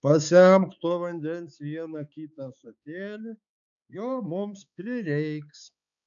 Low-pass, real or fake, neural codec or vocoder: 7.2 kHz; fake; codec, 16 kHz, 4 kbps, X-Codec, HuBERT features, trained on balanced general audio